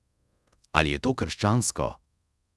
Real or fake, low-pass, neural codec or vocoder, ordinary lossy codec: fake; none; codec, 24 kHz, 0.5 kbps, DualCodec; none